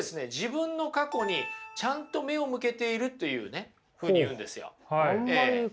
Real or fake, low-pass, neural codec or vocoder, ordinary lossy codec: real; none; none; none